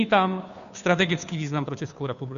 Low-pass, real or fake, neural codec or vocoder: 7.2 kHz; fake; codec, 16 kHz, 2 kbps, FunCodec, trained on Chinese and English, 25 frames a second